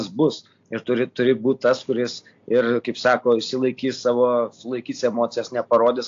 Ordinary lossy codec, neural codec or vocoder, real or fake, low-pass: AAC, 48 kbps; none; real; 7.2 kHz